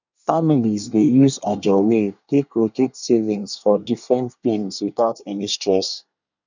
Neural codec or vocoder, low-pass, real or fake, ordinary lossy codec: codec, 24 kHz, 1 kbps, SNAC; 7.2 kHz; fake; none